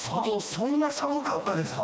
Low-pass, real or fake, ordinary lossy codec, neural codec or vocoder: none; fake; none; codec, 16 kHz, 1 kbps, FreqCodec, smaller model